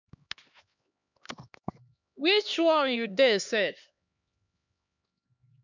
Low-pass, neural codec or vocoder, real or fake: 7.2 kHz; codec, 16 kHz, 2 kbps, X-Codec, HuBERT features, trained on LibriSpeech; fake